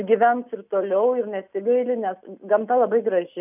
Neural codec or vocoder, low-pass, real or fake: none; 3.6 kHz; real